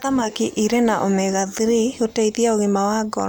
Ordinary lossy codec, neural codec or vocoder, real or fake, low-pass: none; none; real; none